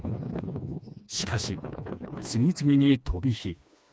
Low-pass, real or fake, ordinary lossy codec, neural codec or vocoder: none; fake; none; codec, 16 kHz, 2 kbps, FreqCodec, smaller model